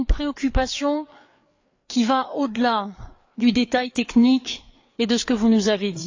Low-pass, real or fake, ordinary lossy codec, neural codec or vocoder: 7.2 kHz; fake; AAC, 48 kbps; codec, 16 kHz, 4 kbps, FreqCodec, larger model